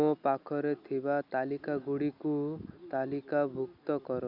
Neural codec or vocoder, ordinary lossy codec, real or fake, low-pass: none; none; real; 5.4 kHz